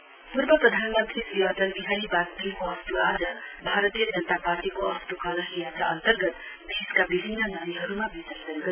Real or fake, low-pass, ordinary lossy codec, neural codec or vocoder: real; 3.6 kHz; none; none